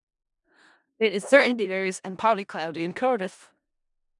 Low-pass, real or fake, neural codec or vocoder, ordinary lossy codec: 10.8 kHz; fake; codec, 16 kHz in and 24 kHz out, 0.4 kbps, LongCat-Audio-Codec, four codebook decoder; none